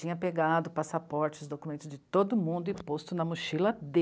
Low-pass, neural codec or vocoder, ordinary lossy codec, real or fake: none; none; none; real